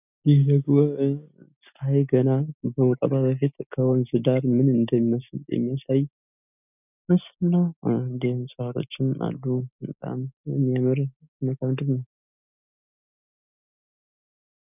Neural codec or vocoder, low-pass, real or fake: none; 3.6 kHz; real